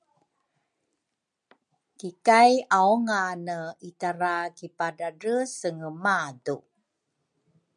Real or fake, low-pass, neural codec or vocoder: real; 9.9 kHz; none